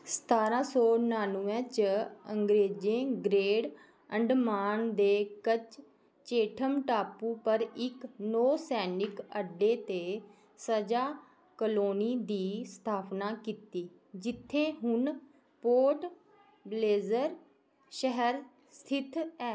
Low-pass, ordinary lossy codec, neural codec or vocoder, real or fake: none; none; none; real